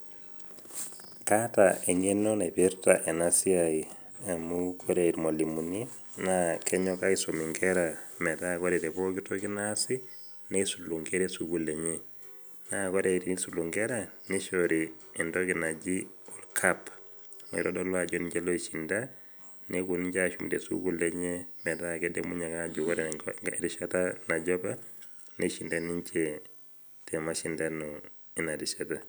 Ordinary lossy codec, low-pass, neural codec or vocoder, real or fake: none; none; none; real